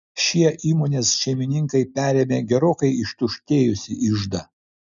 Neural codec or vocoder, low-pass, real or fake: none; 7.2 kHz; real